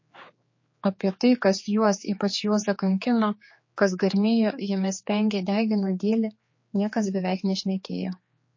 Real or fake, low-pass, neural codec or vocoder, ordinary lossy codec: fake; 7.2 kHz; codec, 16 kHz, 4 kbps, X-Codec, HuBERT features, trained on general audio; MP3, 32 kbps